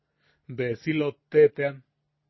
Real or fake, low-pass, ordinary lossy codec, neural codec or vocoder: real; 7.2 kHz; MP3, 24 kbps; none